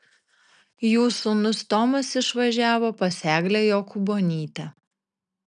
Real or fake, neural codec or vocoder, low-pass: real; none; 9.9 kHz